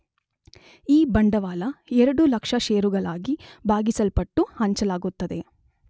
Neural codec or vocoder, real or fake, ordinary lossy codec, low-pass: none; real; none; none